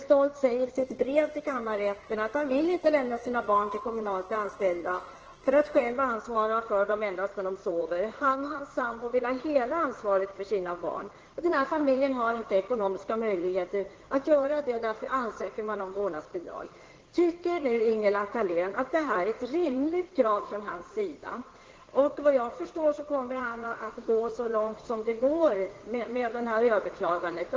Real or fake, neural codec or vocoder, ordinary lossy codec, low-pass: fake; codec, 16 kHz in and 24 kHz out, 2.2 kbps, FireRedTTS-2 codec; Opus, 16 kbps; 7.2 kHz